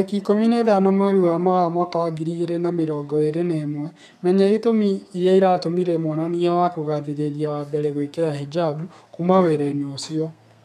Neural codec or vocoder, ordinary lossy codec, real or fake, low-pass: codec, 32 kHz, 1.9 kbps, SNAC; none; fake; 14.4 kHz